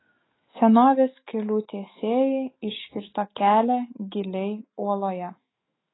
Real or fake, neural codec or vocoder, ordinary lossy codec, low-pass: fake; autoencoder, 48 kHz, 128 numbers a frame, DAC-VAE, trained on Japanese speech; AAC, 16 kbps; 7.2 kHz